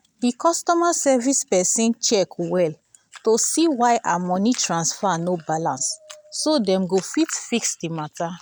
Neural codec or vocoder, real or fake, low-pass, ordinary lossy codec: none; real; none; none